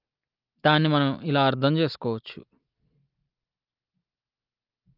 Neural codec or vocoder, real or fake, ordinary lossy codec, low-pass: none; real; Opus, 32 kbps; 5.4 kHz